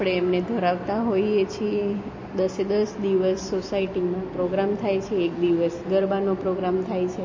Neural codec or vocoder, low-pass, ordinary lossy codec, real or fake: none; 7.2 kHz; MP3, 32 kbps; real